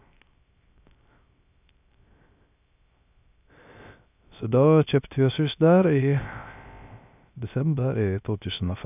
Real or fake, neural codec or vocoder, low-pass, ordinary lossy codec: fake; codec, 16 kHz, 0.3 kbps, FocalCodec; 3.6 kHz; none